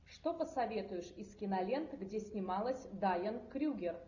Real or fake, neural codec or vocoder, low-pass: real; none; 7.2 kHz